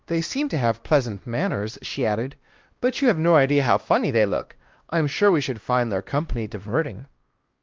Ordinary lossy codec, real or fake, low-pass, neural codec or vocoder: Opus, 32 kbps; fake; 7.2 kHz; codec, 16 kHz, 1 kbps, X-Codec, WavLM features, trained on Multilingual LibriSpeech